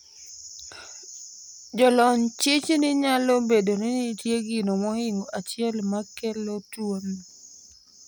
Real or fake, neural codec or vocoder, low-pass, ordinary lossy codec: real; none; none; none